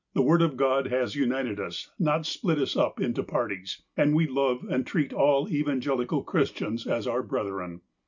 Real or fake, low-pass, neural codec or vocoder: real; 7.2 kHz; none